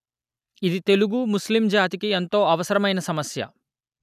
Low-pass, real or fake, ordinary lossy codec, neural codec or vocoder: 14.4 kHz; real; none; none